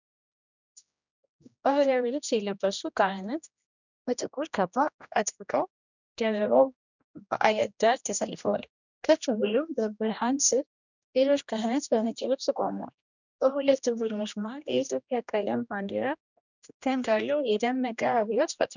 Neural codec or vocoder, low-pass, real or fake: codec, 16 kHz, 1 kbps, X-Codec, HuBERT features, trained on general audio; 7.2 kHz; fake